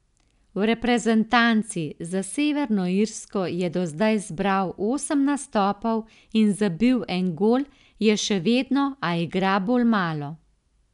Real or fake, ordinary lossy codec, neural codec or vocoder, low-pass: real; none; none; 10.8 kHz